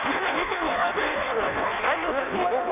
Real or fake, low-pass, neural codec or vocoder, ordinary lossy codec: fake; 3.6 kHz; codec, 16 kHz in and 24 kHz out, 0.6 kbps, FireRedTTS-2 codec; none